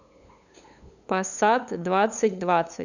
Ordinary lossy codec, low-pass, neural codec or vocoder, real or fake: none; 7.2 kHz; codec, 16 kHz, 8 kbps, FunCodec, trained on LibriTTS, 25 frames a second; fake